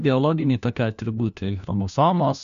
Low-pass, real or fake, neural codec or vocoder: 7.2 kHz; fake; codec, 16 kHz, 1 kbps, FunCodec, trained on LibriTTS, 50 frames a second